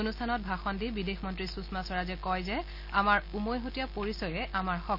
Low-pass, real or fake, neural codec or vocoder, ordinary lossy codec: 5.4 kHz; real; none; none